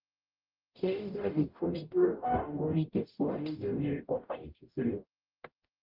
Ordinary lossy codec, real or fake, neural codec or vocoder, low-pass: Opus, 24 kbps; fake; codec, 44.1 kHz, 0.9 kbps, DAC; 5.4 kHz